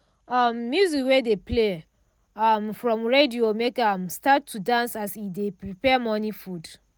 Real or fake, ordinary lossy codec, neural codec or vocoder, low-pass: real; none; none; none